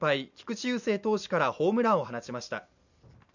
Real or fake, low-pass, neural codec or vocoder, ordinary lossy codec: real; 7.2 kHz; none; none